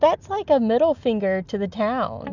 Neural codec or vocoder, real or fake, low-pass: none; real; 7.2 kHz